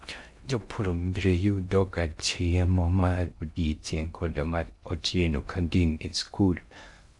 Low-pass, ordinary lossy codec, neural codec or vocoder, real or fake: 10.8 kHz; MP3, 96 kbps; codec, 16 kHz in and 24 kHz out, 0.6 kbps, FocalCodec, streaming, 2048 codes; fake